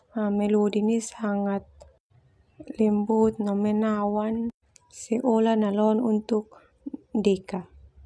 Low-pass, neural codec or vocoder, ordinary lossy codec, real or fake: none; none; none; real